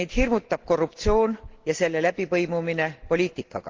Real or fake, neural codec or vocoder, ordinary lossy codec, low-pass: real; none; Opus, 16 kbps; 7.2 kHz